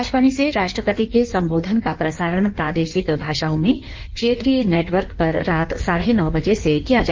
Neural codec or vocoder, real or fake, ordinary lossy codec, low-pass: codec, 16 kHz in and 24 kHz out, 1.1 kbps, FireRedTTS-2 codec; fake; Opus, 24 kbps; 7.2 kHz